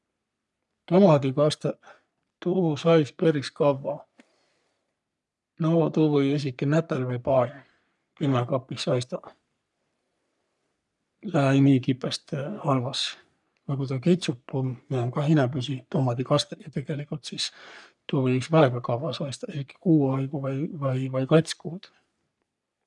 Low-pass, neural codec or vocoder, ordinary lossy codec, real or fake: 10.8 kHz; codec, 44.1 kHz, 3.4 kbps, Pupu-Codec; none; fake